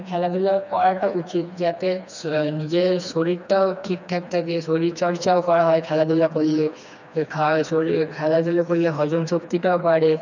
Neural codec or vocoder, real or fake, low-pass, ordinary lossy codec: codec, 16 kHz, 2 kbps, FreqCodec, smaller model; fake; 7.2 kHz; none